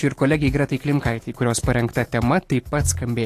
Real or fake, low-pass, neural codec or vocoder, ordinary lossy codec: fake; 14.4 kHz; autoencoder, 48 kHz, 128 numbers a frame, DAC-VAE, trained on Japanese speech; AAC, 48 kbps